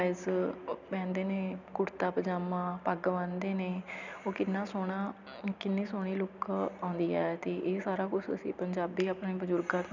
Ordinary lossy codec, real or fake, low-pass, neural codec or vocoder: none; real; 7.2 kHz; none